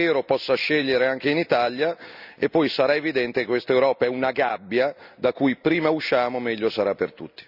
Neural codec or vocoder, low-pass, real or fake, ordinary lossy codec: none; 5.4 kHz; real; none